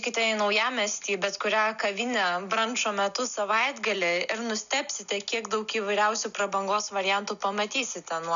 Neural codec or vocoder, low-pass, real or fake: none; 7.2 kHz; real